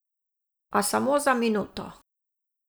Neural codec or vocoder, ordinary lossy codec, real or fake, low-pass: none; none; real; none